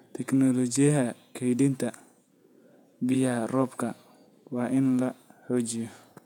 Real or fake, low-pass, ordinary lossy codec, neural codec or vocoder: fake; 19.8 kHz; none; vocoder, 48 kHz, 128 mel bands, Vocos